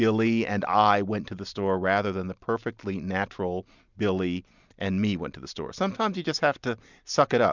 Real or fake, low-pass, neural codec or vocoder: real; 7.2 kHz; none